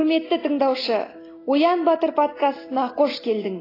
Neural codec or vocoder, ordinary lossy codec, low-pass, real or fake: none; AAC, 24 kbps; 5.4 kHz; real